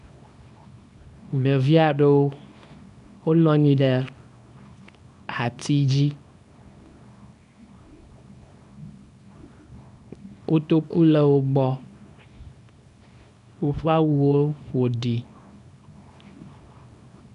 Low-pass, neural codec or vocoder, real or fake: 10.8 kHz; codec, 24 kHz, 0.9 kbps, WavTokenizer, small release; fake